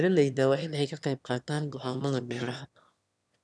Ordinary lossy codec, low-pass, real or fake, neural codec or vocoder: none; none; fake; autoencoder, 22.05 kHz, a latent of 192 numbers a frame, VITS, trained on one speaker